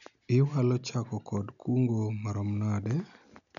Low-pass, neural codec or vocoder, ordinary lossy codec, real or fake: 7.2 kHz; none; none; real